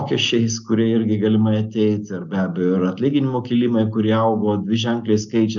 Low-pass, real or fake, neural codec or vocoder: 7.2 kHz; real; none